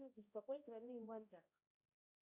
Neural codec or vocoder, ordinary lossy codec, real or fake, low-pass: codec, 16 kHz, 0.5 kbps, X-Codec, HuBERT features, trained on balanced general audio; Opus, 64 kbps; fake; 3.6 kHz